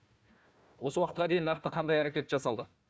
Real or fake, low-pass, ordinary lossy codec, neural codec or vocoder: fake; none; none; codec, 16 kHz, 1 kbps, FunCodec, trained on Chinese and English, 50 frames a second